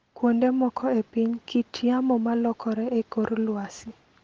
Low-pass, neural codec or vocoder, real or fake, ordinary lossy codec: 7.2 kHz; none; real; Opus, 16 kbps